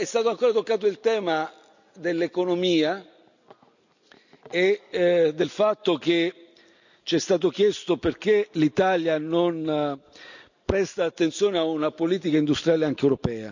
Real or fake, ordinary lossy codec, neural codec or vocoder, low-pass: real; none; none; 7.2 kHz